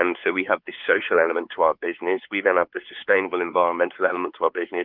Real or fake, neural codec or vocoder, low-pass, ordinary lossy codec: fake; codec, 16 kHz, 4 kbps, X-Codec, WavLM features, trained on Multilingual LibriSpeech; 5.4 kHz; Opus, 24 kbps